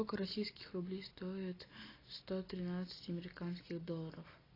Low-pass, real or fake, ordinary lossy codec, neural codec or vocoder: 5.4 kHz; real; AAC, 24 kbps; none